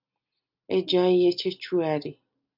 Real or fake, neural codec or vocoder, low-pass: real; none; 5.4 kHz